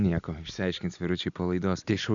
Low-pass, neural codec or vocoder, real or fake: 7.2 kHz; none; real